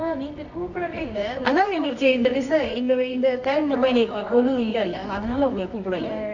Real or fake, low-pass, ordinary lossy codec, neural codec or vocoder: fake; 7.2 kHz; none; codec, 24 kHz, 0.9 kbps, WavTokenizer, medium music audio release